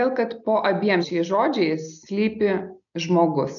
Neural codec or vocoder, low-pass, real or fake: none; 7.2 kHz; real